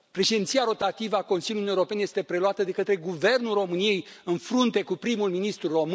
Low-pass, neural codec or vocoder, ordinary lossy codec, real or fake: none; none; none; real